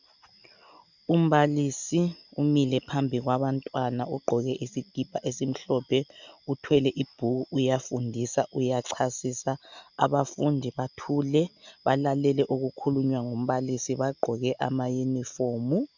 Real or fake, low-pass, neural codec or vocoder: real; 7.2 kHz; none